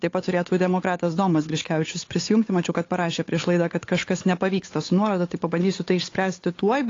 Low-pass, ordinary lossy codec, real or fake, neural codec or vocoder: 7.2 kHz; AAC, 32 kbps; fake; codec, 16 kHz, 8 kbps, FunCodec, trained on Chinese and English, 25 frames a second